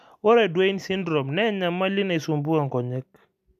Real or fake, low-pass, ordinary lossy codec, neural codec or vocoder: real; 14.4 kHz; none; none